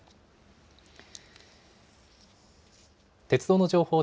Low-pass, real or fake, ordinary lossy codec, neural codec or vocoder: none; real; none; none